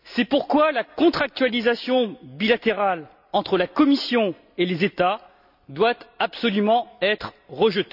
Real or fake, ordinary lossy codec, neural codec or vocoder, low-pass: real; none; none; 5.4 kHz